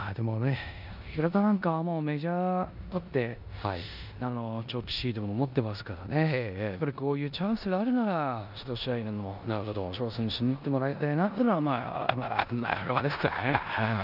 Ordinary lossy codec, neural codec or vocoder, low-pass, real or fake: none; codec, 16 kHz in and 24 kHz out, 0.9 kbps, LongCat-Audio-Codec, four codebook decoder; 5.4 kHz; fake